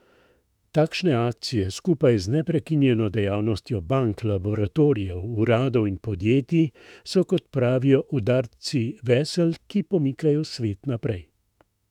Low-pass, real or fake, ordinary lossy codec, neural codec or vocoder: 19.8 kHz; fake; none; autoencoder, 48 kHz, 32 numbers a frame, DAC-VAE, trained on Japanese speech